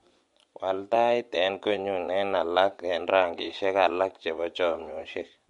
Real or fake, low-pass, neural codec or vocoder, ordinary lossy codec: fake; 10.8 kHz; vocoder, 24 kHz, 100 mel bands, Vocos; MP3, 64 kbps